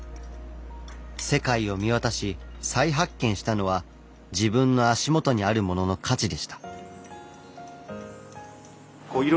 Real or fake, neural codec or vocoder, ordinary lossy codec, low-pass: real; none; none; none